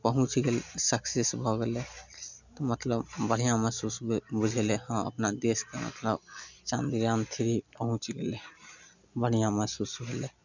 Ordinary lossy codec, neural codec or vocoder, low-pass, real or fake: none; none; 7.2 kHz; real